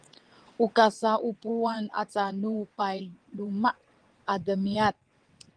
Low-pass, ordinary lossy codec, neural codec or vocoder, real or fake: 9.9 kHz; Opus, 24 kbps; vocoder, 22.05 kHz, 80 mel bands, WaveNeXt; fake